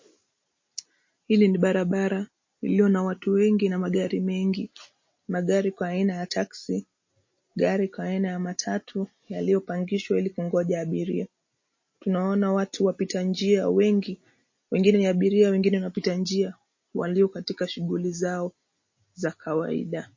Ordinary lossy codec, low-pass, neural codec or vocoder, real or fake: MP3, 32 kbps; 7.2 kHz; none; real